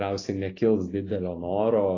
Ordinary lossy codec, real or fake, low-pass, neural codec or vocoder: AAC, 32 kbps; real; 7.2 kHz; none